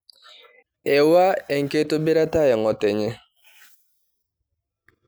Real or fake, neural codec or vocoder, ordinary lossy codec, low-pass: real; none; none; none